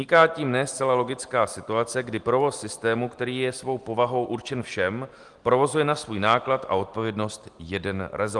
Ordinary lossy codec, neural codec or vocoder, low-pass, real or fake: Opus, 24 kbps; none; 10.8 kHz; real